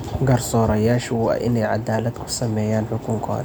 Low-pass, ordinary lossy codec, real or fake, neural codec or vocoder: none; none; real; none